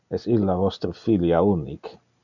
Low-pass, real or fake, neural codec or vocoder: 7.2 kHz; real; none